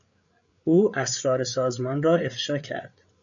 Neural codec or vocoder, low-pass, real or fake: codec, 16 kHz, 8 kbps, FreqCodec, larger model; 7.2 kHz; fake